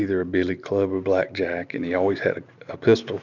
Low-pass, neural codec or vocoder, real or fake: 7.2 kHz; none; real